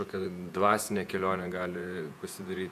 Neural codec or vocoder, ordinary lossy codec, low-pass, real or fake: vocoder, 48 kHz, 128 mel bands, Vocos; AAC, 96 kbps; 14.4 kHz; fake